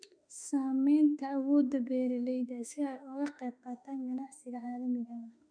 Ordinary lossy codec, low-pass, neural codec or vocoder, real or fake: none; 9.9 kHz; autoencoder, 48 kHz, 32 numbers a frame, DAC-VAE, trained on Japanese speech; fake